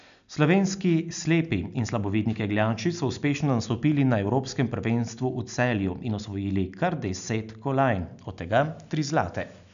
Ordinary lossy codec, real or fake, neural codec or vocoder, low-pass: none; real; none; 7.2 kHz